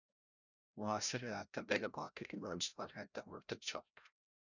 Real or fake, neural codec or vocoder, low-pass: fake; codec, 16 kHz, 0.5 kbps, FreqCodec, larger model; 7.2 kHz